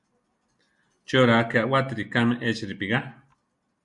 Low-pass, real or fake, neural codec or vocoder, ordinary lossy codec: 10.8 kHz; real; none; MP3, 96 kbps